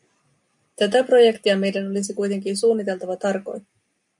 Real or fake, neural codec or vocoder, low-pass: real; none; 10.8 kHz